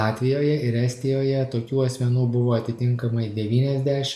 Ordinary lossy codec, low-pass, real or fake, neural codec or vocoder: MP3, 96 kbps; 14.4 kHz; real; none